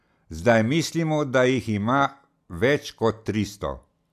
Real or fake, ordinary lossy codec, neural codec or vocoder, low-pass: real; none; none; 14.4 kHz